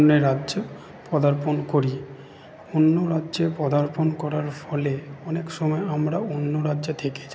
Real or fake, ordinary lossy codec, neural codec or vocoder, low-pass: real; none; none; none